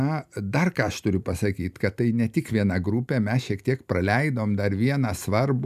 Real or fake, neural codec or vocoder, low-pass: real; none; 14.4 kHz